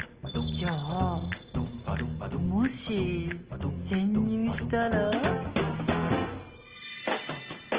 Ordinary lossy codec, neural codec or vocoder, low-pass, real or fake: Opus, 16 kbps; none; 3.6 kHz; real